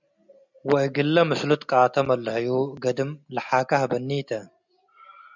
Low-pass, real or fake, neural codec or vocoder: 7.2 kHz; real; none